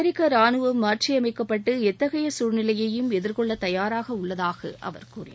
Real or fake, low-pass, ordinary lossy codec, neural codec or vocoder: real; none; none; none